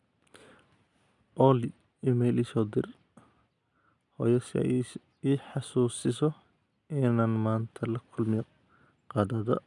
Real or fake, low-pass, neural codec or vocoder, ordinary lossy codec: real; 10.8 kHz; none; none